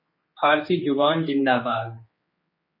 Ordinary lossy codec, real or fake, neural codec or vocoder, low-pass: MP3, 24 kbps; fake; codec, 16 kHz, 2 kbps, X-Codec, HuBERT features, trained on general audio; 5.4 kHz